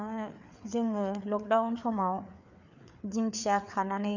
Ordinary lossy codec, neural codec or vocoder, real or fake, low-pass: none; codec, 16 kHz, 4 kbps, FreqCodec, larger model; fake; 7.2 kHz